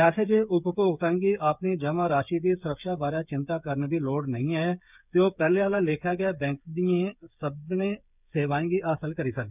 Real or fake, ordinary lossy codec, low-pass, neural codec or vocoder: fake; none; 3.6 kHz; codec, 16 kHz, 8 kbps, FreqCodec, smaller model